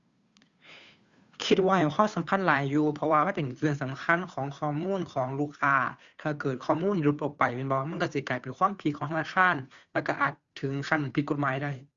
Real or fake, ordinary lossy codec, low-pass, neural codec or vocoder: fake; Opus, 64 kbps; 7.2 kHz; codec, 16 kHz, 2 kbps, FunCodec, trained on Chinese and English, 25 frames a second